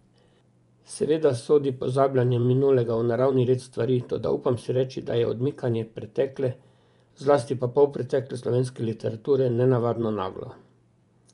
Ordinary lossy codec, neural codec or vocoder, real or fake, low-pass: none; vocoder, 24 kHz, 100 mel bands, Vocos; fake; 10.8 kHz